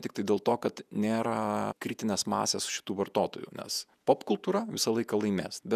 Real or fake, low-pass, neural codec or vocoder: fake; 14.4 kHz; vocoder, 48 kHz, 128 mel bands, Vocos